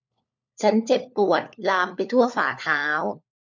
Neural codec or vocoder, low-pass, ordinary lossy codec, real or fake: codec, 16 kHz, 4 kbps, FunCodec, trained on LibriTTS, 50 frames a second; 7.2 kHz; none; fake